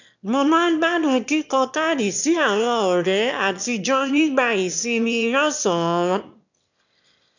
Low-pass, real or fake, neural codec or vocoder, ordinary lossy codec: 7.2 kHz; fake; autoencoder, 22.05 kHz, a latent of 192 numbers a frame, VITS, trained on one speaker; none